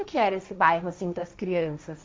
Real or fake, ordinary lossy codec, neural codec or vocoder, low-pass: fake; none; codec, 16 kHz, 1.1 kbps, Voila-Tokenizer; none